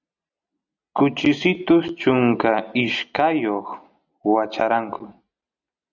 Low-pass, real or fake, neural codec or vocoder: 7.2 kHz; real; none